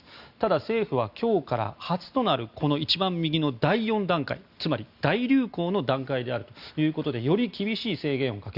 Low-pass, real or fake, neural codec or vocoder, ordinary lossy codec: 5.4 kHz; real; none; Opus, 64 kbps